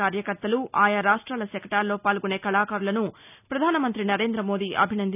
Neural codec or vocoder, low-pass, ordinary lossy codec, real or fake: none; 3.6 kHz; none; real